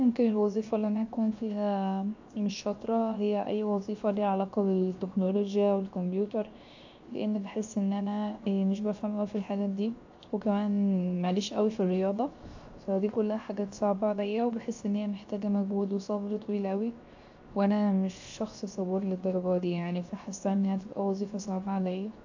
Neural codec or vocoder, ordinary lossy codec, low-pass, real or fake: codec, 16 kHz, 0.7 kbps, FocalCodec; AAC, 48 kbps; 7.2 kHz; fake